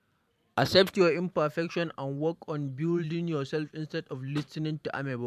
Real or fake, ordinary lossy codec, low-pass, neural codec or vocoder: fake; none; 14.4 kHz; vocoder, 44.1 kHz, 128 mel bands every 512 samples, BigVGAN v2